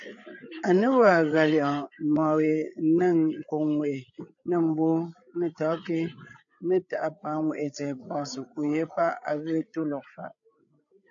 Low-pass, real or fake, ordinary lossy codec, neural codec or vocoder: 7.2 kHz; fake; AAC, 64 kbps; codec, 16 kHz, 8 kbps, FreqCodec, larger model